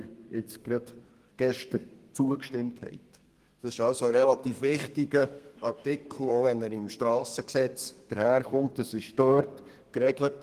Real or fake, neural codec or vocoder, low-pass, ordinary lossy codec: fake; codec, 32 kHz, 1.9 kbps, SNAC; 14.4 kHz; Opus, 24 kbps